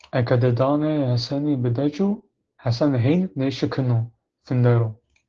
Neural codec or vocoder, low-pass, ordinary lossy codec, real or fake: codec, 16 kHz, 6 kbps, DAC; 7.2 kHz; Opus, 16 kbps; fake